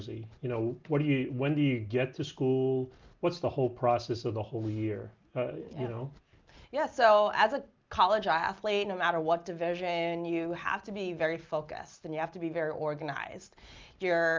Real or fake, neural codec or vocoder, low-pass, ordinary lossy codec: real; none; 7.2 kHz; Opus, 24 kbps